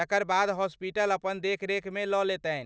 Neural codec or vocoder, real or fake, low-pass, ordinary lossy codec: none; real; none; none